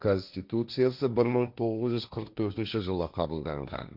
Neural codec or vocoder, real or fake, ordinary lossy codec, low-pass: codec, 16 kHz, 1.1 kbps, Voila-Tokenizer; fake; none; 5.4 kHz